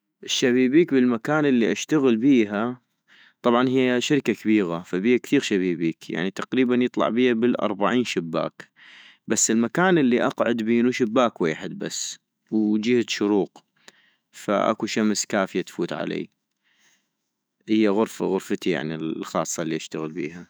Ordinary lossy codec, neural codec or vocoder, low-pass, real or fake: none; autoencoder, 48 kHz, 128 numbers a frame, DAC-VAE, trained on Japanese speech; none; fake